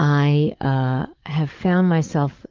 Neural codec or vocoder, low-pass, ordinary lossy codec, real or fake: codec, 44.1 kHz, 7.8 kbps, DAC; 7.2 kHz; Opus, 24 kbps; fake